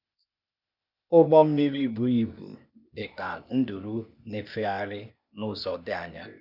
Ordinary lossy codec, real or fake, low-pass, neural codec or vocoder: none; fake; 5.4 kHz; codec, 16 kHz, 0.8 kbps, ZipCodec